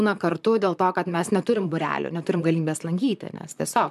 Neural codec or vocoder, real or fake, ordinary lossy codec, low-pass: vocoder, 44.1 kHz, 128 mel bands, Pupu-Vocoder; fake; MP3, 96 kbps; 14.4 kHz